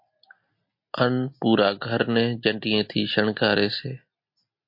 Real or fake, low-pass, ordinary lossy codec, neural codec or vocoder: real; 5.4 kHz; MP3, 32 kbps; none